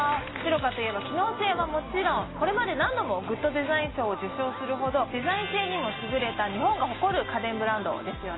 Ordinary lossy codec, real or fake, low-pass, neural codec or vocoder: AAC, 16 kbps; real; 7.2 kHz; none